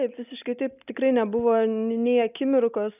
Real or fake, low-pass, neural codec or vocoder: real; 3.6 kHz; none